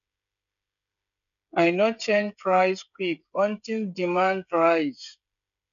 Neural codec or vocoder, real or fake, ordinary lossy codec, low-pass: codec, 16 kHz, 16 kbps, FreqCodec, smaller model; fake; none; 7.2 kHz